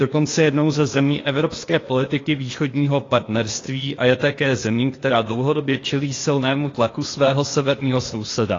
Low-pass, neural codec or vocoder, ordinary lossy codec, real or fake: 7.2 kHz; codec, 16 kHz, 0.8 kbps, ZipCodec; AAC, 32 kbps; fake